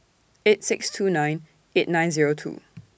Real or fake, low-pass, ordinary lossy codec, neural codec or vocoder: real; none; none; none